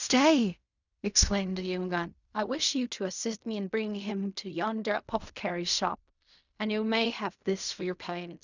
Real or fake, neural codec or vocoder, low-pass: fake; codec, 16 kHz in and 24 kHz out, 0.4 kbps, LongCat-Audio-Codec, fine tuned four codebook decoder; 7.2 kHz